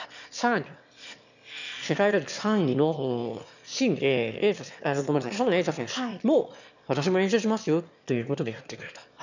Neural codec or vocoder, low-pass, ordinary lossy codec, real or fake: autoencoder, 22.05 kHz, a latent of 192 numbers a frame, VITS, trained on one speaker; 7.2 kHz; none; fake